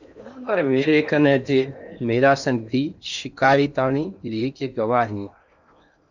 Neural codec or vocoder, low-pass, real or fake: codec, 16 kHz in and 24 kHz out, 0.8 kbps, FocalCodec, streaming, 65536 codes; 7.2 kHz; fake